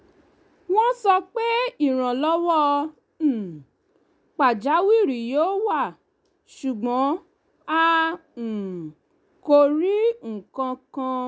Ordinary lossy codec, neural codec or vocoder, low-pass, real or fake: none; none; none; real